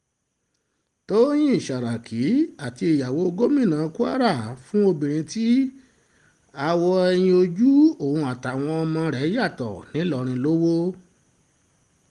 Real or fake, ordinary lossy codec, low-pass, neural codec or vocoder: real; Opus, 24 kbps; 10.8 kHz; none